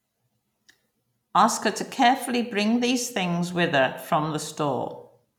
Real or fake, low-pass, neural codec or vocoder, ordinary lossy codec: real; 19.8 kHz; none; none